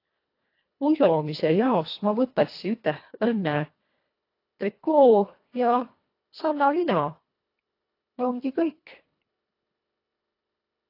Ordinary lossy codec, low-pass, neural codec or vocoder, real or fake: AAC, 32 kbps; 5.4 kHz; codec, 24 kHz, 1.5 kbps, HILCodec; fake